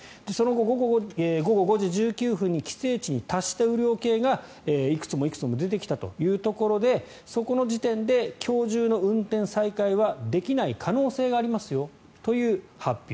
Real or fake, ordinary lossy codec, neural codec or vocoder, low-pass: real; none; none; none